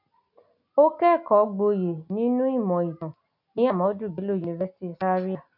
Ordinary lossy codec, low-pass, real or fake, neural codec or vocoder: MP3, 48 kbps; 5.4 kHz; real; none